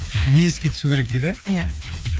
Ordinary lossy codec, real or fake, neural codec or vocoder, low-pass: none; fake; codec, 16 kHz, 2 kbps, FreqCodec, larger model; none